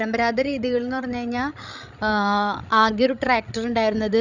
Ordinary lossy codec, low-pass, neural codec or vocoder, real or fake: none; 7.2 kHz; codec, 16 kHz, 16 kbps, FreqCodec, larger model; fake